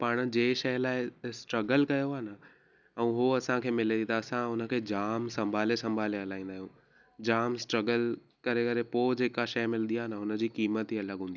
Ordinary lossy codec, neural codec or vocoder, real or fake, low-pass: none; none; real; 7.2 kHz